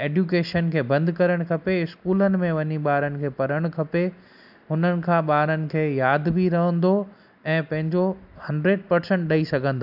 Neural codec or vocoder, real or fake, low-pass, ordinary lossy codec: none; real; 5.4 kHz; AAC, 48 kbps